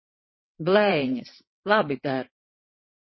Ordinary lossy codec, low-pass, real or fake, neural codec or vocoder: MP3, 24 kbps; 7.2 kHz; fake; vocoder, 22.05 kHz, 80 mel bands, WaveNeXt